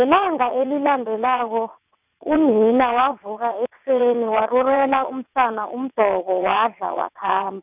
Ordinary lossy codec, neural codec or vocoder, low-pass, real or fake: none; vocoder, 22.05 kHz, 80 mel bands, WaveNeXt; 3.6 kHz; fake